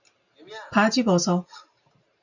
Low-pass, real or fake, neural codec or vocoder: 7.2 kHz; real; none